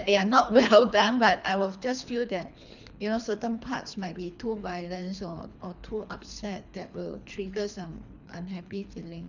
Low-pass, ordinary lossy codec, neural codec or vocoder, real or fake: 7.2 kHz; none; codec, 24 kHz, 3 kbps, HILCodec; fake